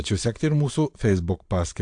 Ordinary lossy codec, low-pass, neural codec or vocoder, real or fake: AAC, 64 kbps; 9.9 kHz; vocoder, 22.05 kHz, 80 mel bands, Vocos; fake